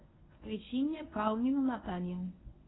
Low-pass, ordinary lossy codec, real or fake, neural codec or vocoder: 7.2 kHz; AAC, 16 kbps; fake; codec, 24 kHz, 0.9 kbps, WavTokenizer, medium speech release version 1